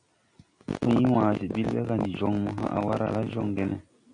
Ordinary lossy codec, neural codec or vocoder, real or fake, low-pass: AAC, 64 kbps; none; real; 9.9 kHz